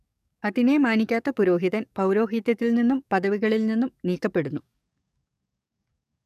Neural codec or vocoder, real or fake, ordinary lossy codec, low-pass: codec, 44.1 kHz, 7.8 kbps, DAC; fake; none; 14.4 kHz